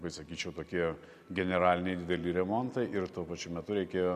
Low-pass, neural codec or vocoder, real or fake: 14.4 kHz; none; real